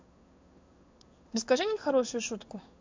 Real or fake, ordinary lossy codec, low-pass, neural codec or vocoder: fake; MP3, 64 kbps; 7.2 kHz; codec, 16 kHz in and 24 kHz out, 1 kbps, XY-Tokenizer